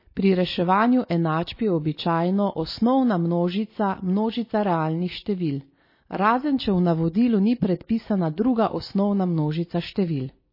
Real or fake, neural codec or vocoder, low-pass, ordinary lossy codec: real; none; 5.4 kHz; MP3, 24 kbps